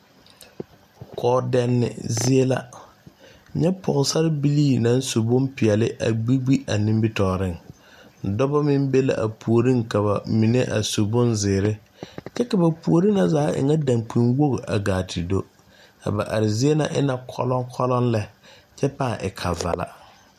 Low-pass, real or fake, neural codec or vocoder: 14.4 kHz; real; none